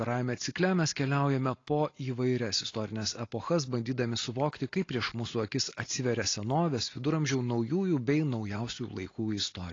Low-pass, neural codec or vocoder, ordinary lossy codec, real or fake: 7.2 kHz; none; AAC, 32 kbps; real